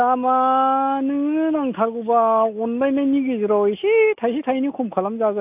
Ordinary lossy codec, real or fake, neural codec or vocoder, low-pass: AAC, 32 kbps; real; none; 3.6 kHz